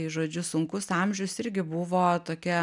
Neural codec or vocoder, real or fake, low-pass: none; real; 10.8 kHz